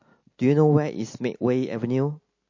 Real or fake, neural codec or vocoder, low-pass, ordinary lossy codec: real; none; 7.2 kHz; MP3, 32 kbps